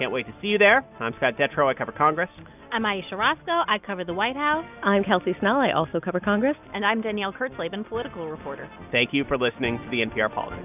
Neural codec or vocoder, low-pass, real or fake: none; 3.6 kHz; real